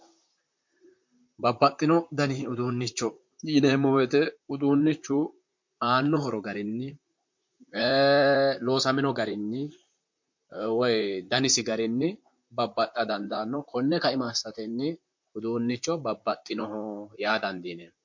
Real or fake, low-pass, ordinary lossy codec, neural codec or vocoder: fake; 7.2 kHz; MP3, 48 kbps; vocoder, 44.1 kHz, 128 mel bands, Pupu-Vocoder